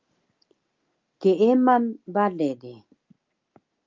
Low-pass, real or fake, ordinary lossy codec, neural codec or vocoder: 7.2 kHz; real; Opus, 24 kbps; none